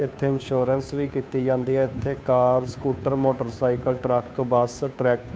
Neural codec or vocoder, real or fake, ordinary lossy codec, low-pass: codec, 16 kHz, 2 kbps, FunCodec, trained on Chinese and English, 25 frames a second; fake; none; none